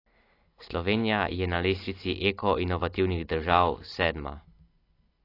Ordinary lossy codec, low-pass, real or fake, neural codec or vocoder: AAC, 32 kbps; 5.4 kHz; real; none